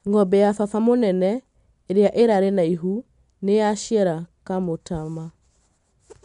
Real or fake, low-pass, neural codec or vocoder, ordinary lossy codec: real; 10.8 kHz; none; MP3, 64 kbps